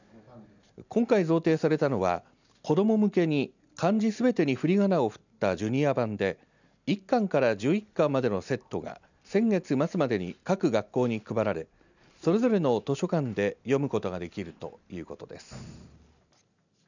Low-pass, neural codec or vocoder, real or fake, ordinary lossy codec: 7.2 kHz; none; real; none